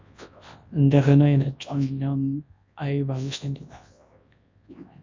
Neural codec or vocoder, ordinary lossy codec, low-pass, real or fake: codec, 24 kHz, 0.9 kbps, WavTokenizer, large speech release; MP3, 64 kbps; 7.2 kHz; fake